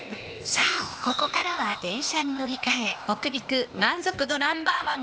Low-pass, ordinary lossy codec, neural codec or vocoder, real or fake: none; none; codec, 16 kHz, 0.8 kbps, ZipCodec; fake